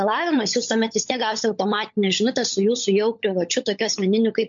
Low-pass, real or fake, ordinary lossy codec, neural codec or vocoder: 7.2 kHz; fake; MP3, 48 kbps; codec, 16 kHz, 16 kbps, FunCodec, trained on LibriTTS, 50 frames a second